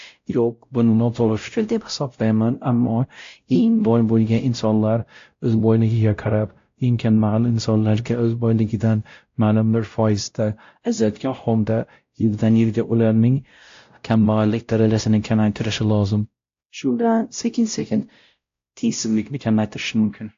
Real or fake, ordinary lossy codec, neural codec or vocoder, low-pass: fake; AAC, 48 kbps; codec, 16 kHz, 0.5 kbps, X-Codec, WavLM features, trained on Multilingual LibriSpeech; 7.2 kHz